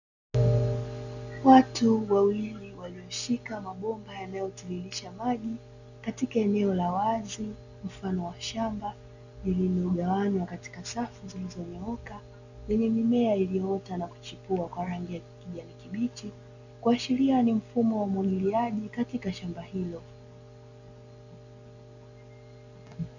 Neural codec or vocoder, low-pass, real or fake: none; 7.2 kHz; real